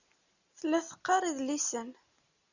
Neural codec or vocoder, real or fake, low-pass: none; real; 7.2 kHz